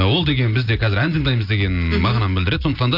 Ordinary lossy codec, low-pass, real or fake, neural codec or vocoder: none; 5.4 kHz; real; none